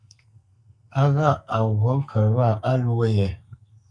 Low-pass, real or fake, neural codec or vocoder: 9.9 kHz; fake; codec, 44.1 kHz, 2.6 kbps, SNAC